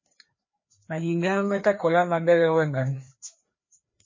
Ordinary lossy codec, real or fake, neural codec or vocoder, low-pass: MP3, 32 kbps; fake; codec, 16 kHz, 2 kbps, FreqCodec, larger model; 7.2 kHz